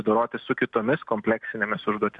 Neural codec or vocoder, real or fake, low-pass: none; real; 10.8 kHz